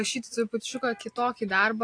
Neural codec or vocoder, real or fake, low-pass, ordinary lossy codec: none; real; 9.9 kHz; AAC, 48 kbps